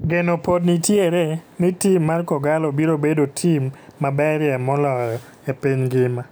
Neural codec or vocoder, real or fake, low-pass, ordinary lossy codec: none; real; none; none